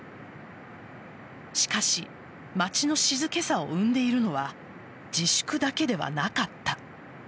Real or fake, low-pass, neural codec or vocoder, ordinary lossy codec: real; none; none; none